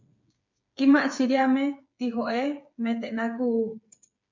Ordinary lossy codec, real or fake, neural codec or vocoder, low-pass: MP3, 48 kbps; fake; codec, 16 kHz, 8 kbps, FreqCodec, smaller model; 7.2 kHz